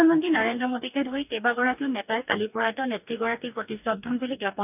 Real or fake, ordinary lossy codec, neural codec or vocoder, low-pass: fake; none; codec, 44.1 kHz, 2.6 kbps, DAC; 3.6 kHz